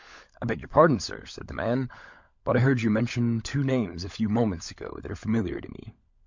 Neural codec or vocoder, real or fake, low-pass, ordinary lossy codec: codec, 16 kHz, 16 kbps, FunCodec, trained on LibriTTS, 50 frames a second; fake; 7.2 kHz; MP3, 64 kbps